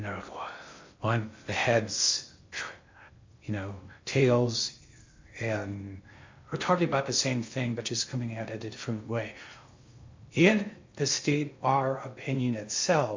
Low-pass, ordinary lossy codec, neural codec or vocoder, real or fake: 7.2 kHz; MP3, 48 kbps; codec, 16 kHz in and 24 kHz out, 0.6 kbps, FocalCodec, streaming, 2048 codes; fake